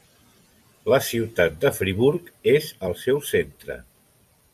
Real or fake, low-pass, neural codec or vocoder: fake; 14.4 kHz; vocoder, 44.1 kHz, 128 mel bands every 256 samples, BigVGAN v2